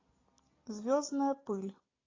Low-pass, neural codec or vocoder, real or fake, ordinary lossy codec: 7.2 kHz; none; real; AAC, 32 kbps